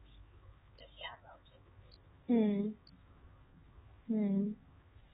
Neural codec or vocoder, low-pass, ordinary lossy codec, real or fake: codec, 16 kHz, 4 kbps, X-Codec, HuBERT features, trained on LibriSpeech; 7.2 kHz; AAC, 16 kbps; fake